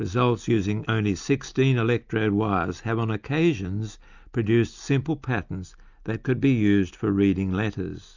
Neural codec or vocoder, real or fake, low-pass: none; real; 7.2 kHz